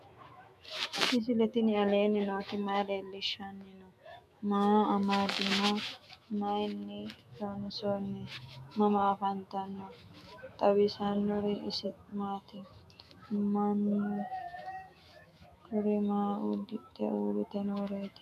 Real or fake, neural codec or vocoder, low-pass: fake; autoencoder, 48 kHz, 128 numbers a frame, DAC-VAE, trained on Japanese speech; 14.4 kHz